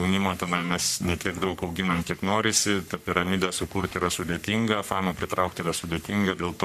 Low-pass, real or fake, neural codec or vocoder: 14.4 kHz; fake; codec, 44.1 kHz, 3.4 kbps, Pupu-Codec